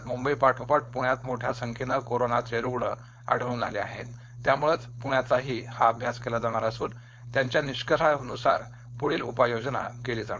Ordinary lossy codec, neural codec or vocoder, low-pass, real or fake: none; codec, 16 kHz, 4.8 kbps, FACodec; none; fake